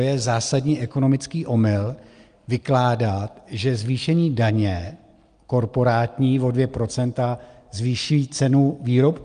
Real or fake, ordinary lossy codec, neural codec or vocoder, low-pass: real; Opus, 32 kbps; none; 9.9 kHz